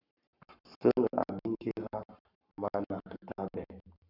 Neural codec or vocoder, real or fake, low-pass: codec, 44.1 kHz, 7.8 kbps, Pupu-Codec; fake; 5.4 kHz